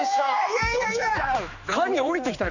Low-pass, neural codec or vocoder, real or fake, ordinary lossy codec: 7.2 kHz; codec, 16 kHz, 2 kbps, X-Codec, HuBERT features, trained on general audio; fake; none